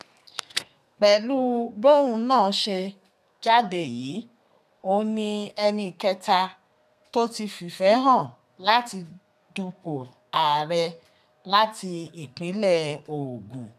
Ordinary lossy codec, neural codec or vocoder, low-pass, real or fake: none; codec, 32 kHz, 1.9 kbps, SNAC; 14.4 kHz; fake